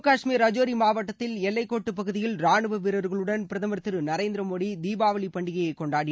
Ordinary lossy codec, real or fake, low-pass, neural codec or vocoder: none; real; none; none